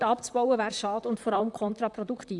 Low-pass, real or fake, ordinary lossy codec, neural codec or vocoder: 10.8 kHz; fake; none; vocoder, 44.1 kHz, 128 mel bands, Pupu-Vocoder